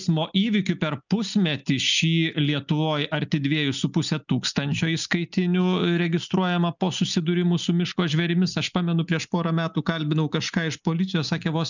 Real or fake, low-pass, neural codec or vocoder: real; 7.2 kHz; none